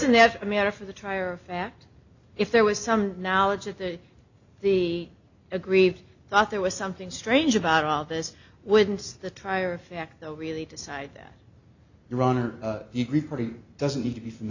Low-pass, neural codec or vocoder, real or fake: 7.2 kHz; none; real